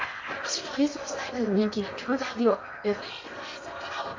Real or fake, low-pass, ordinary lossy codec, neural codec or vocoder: fake; 7.2 kHz; MP3, 48 kbps; codec, 16 kHz in and 24 kHz out, 0.8 kbps, FocalCodec, streaming, 65536 codes